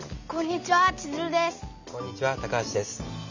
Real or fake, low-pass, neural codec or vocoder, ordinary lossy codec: real; 7.2 kHz; none; none